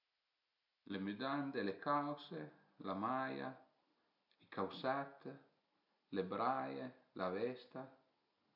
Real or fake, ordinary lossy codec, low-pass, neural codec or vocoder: real; none; 5.4 kHz; none